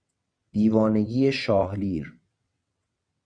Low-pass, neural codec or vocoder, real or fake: 9.9 kHz; vocoder, 22.05 kHz, 80 mel bands, WaveNeXt; fake